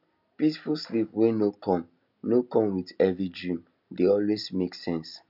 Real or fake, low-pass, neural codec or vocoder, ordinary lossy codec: real; 5.4 kHz; none; none